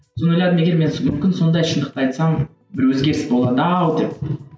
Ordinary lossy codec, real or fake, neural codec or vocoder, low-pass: none; real; none; none